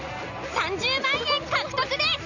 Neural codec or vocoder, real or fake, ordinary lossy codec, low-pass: none; real; none; 7.2 kHz